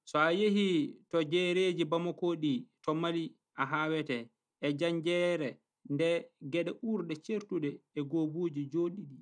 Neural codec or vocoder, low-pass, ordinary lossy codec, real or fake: none; 9.9 kHz; none; real